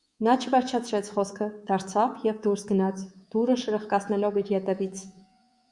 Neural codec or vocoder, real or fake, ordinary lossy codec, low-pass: codec, 24 kHz, 3.1 kbps, DualCodec; fake; Opus, 64 kbps; 10.8 kHz